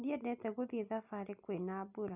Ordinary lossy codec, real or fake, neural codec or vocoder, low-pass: none; real; none; 3.6 kHz